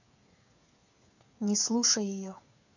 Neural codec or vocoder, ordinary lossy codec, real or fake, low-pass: codec, 16 kHz, 8 kbps, FreqCodec, smaller model; none; fake; 7.2 kHz